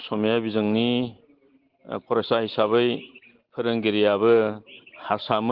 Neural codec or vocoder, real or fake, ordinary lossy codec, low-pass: none; real; Opus, 16 kbps; 5.4 kHz